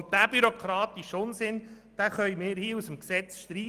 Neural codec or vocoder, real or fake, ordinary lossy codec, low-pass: none; real; Opus, 32 kbps; 14.4 kHz